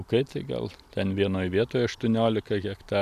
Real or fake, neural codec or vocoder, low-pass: real; none; 14.4 kHz